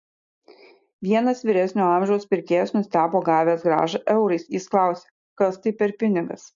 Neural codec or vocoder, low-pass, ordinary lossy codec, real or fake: none; 7.2 kHz; MP3, 64 kbps; real